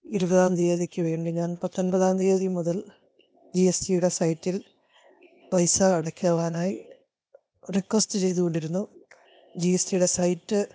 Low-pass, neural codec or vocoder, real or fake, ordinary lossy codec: none; codec, 16 kHz, 0.8 kbps, ZipCodec; fake; none